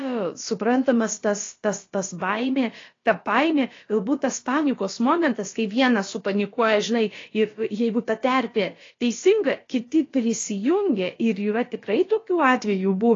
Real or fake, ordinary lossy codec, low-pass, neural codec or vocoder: fake; AAC, 32 kbps; 7.2 kHz; codec, 16 kHz, about 1 kbps, DyCAST, with the encoder's durations